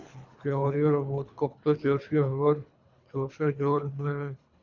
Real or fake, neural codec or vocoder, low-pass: fake; codec, 24 kHz, 3 kbps, HILCodec; 7.2 kHz